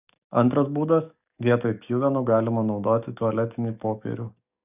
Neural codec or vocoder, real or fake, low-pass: codec, 44.1 kHz, 7.8 kbps, DAC; fake; 3.6 kHz